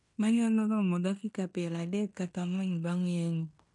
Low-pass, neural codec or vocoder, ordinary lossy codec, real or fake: 10.8 kHz; codec, 16 kHz in and 24 kHz out, 0.9 kbps, LongCat-Audio-Codec, fine tuned four codebook decoder; MP3, 64 kbps; fake